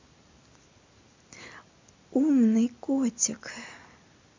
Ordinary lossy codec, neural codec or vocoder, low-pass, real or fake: MP3, 64 kbps; none; 7.2 kHz; real